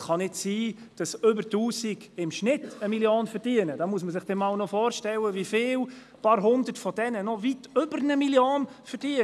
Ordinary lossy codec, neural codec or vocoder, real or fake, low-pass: none; none; real; none